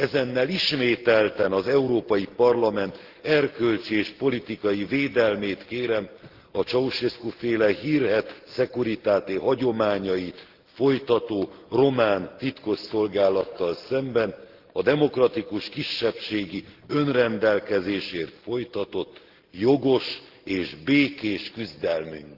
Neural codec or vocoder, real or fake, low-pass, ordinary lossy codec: none; real; 5.4 kHz; Opus, 16 kbps